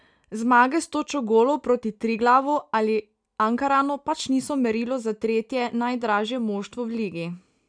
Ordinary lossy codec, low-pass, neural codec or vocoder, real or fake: none; 9.9 kHz; none; real